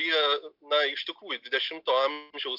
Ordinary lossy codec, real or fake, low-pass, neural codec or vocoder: MP3, 48 kbps; real; 5.4 kHz; none